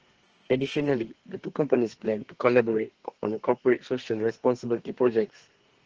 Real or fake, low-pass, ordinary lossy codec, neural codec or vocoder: fake; 7.2 kHz; Opus, 16 kbps; codec, 32 kHz, 1.9 kbps, SNAC